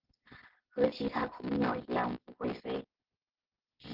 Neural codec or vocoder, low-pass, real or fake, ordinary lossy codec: none; 5.4 kHz; real; Opus, 16 kbps